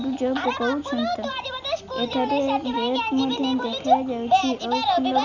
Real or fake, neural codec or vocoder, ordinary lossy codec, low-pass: real; none; none; 7.2 kHz